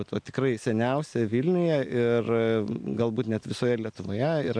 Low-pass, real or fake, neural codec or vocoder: 9.9 kHz; real; none